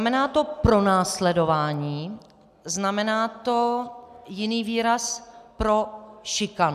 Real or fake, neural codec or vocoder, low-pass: real; none; 14.4 kHz